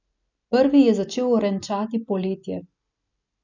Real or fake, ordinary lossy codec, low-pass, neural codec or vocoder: real; none; 7.2 kHz; none